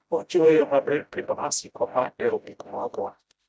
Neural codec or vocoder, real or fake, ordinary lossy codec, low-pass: codec, 16 kHz, 0.5 kbps, FreqCodec, smaller model; fake; none; none